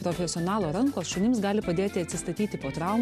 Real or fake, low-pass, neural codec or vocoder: real; 14.4 kHz; none